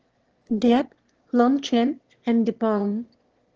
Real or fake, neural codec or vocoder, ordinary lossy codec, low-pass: fake; autoencoder, 22.05 kHz, a latent of 192 numbers a frame, VITS, trained on one speaker; Opus, 16 kbps; 7.2 kHz